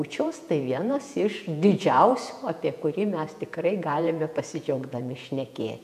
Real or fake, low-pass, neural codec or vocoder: fake; 14.4 kHz; vocoder, 48 kHz, 128 mel bands, Vocos